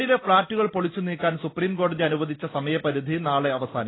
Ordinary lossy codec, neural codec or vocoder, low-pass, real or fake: AAC, 16 kbps; none; 7.2 kHz; real